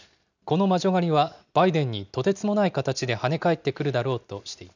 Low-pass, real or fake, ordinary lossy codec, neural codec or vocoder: 7.2 kHz; real; none; none